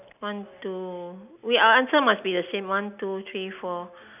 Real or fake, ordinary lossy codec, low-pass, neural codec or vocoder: real; none; 3.6 kHz; none